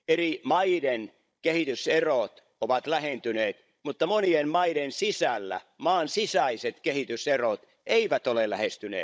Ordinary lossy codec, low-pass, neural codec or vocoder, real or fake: none; none; codec, 16 kHz, 16 kbps, FunCodec, trained on Chinese and English, 50 frames a second; fake